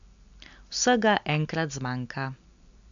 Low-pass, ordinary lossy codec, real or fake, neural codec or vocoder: 7.2 kHz; MP3, 64 kbps; real; none